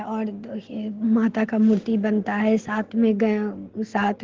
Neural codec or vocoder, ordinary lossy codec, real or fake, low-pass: none; Opus, 16 kbps; real; 7.2 kHz